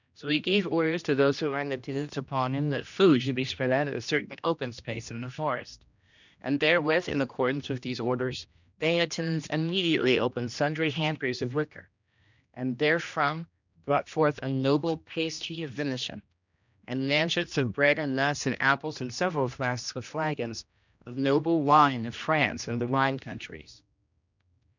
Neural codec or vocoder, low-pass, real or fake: codec, 16 kHz, 1 kbps, X-Codec, HuBERT features, trained on general audio; 7.2 kHz; fake